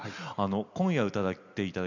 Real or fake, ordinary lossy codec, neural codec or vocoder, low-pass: real; none; none; 7.2 kHz